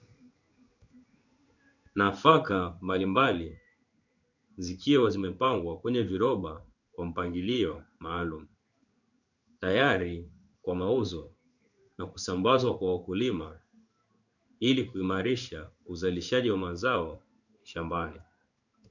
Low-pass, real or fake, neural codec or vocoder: 7.2 kHz; fake; codec, 16 kHz in and 24 kHz out, 1 kbps, XY-Tokenizer